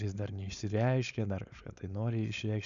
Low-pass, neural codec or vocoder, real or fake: 7.2 kHz; codec, 16 kHz, 4.8 kbps, FACodec; fake